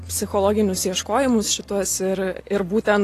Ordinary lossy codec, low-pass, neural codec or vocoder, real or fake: AAC, 48 kbps; 14.4 kHz; none; real